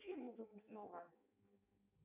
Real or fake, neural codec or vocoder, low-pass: fake; codec, 16 kHz in and 24 kHz out, 0.6 kbps, FireRedTTS-2 codec; 3.6 kHz